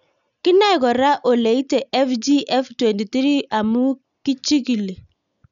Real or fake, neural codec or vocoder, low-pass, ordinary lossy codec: real; none; 7.2 kHz; none